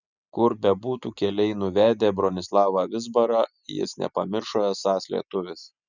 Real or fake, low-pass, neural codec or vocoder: real; 7.2 kHz; none